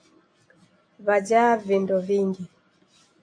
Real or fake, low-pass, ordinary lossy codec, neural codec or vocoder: real; 9.9 kHz; AAC, 48 kbps; none